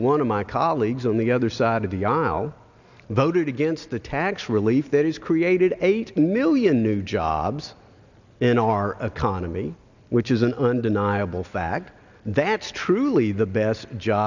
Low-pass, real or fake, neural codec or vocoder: 7.2 kHz; real; none